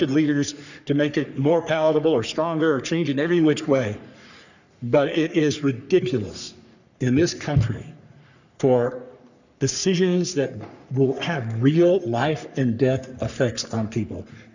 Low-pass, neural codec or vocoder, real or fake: 7.2 kHz; codec, 44.1 kHz, 3.4 kbps, Pupu-Codec; fake